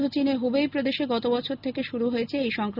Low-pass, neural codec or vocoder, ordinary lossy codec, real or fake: 5.4 kHz; none; none; real